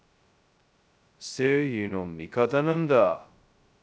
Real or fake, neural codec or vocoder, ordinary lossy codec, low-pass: fake; codec, 16 kHz, 0.2 kbps, FocalCodec; none; none